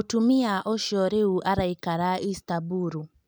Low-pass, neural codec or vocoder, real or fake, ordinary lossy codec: none; none; real; none